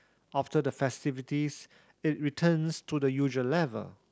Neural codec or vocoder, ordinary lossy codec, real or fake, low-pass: none; none; real; none